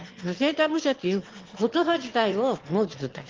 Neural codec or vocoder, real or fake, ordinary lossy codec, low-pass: autoencoder, 22.05 kHz, a latent of 192 numbers a frame, VITS, trained on one speaker; fake; Opus, 16 kbps; 7.2 kHz